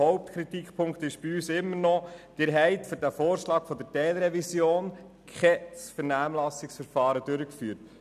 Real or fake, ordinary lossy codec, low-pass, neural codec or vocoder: real; none; 14.4 kHz; none